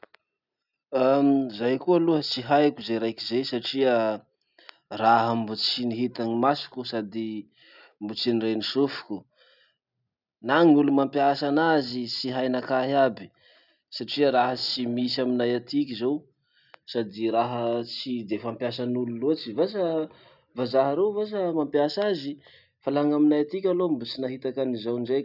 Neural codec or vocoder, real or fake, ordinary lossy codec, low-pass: none; real; none; 5.4 kHz